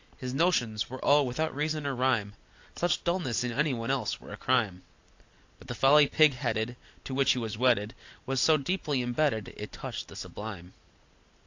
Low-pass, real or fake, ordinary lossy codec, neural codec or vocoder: 7.2 kHz; real; AAC, 48 kbps; none